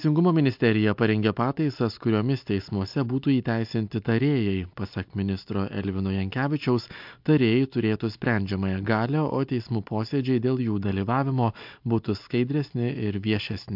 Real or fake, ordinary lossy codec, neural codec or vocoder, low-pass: real; MP3, 48 kbps; none; 5.4 kHz